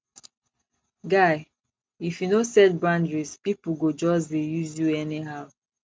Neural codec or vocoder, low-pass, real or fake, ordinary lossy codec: none; none; real; none